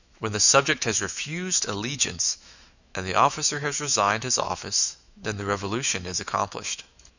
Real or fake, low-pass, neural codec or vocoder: fake; 7.2 kHz; autoencoder, 48 kHz, 128 numbers a frame, DAC-VAE, trained on Japanese speech